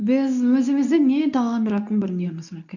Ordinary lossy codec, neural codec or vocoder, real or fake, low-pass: none; codec, 24 kHz, 0.9 kbps, WavTokenizer, medium speech release version 2; fake; 7.2 kHz